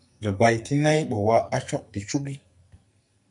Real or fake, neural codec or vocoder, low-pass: fake; codec, 44.1 kHz, 2.6 kbps, SNAC; 10.8 kHz